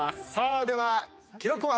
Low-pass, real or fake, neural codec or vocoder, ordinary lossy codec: none; fake; codec, 16 kHz, 2 kbps, X-Codec, HuBERT features, trained on general audio; none